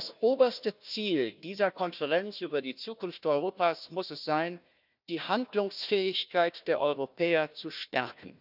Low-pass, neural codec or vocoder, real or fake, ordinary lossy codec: 5.4 kHz; codec, 16 kHz, 1 kbps, FunCodec, trained on Chinese and English, 50 frames a second; fake; none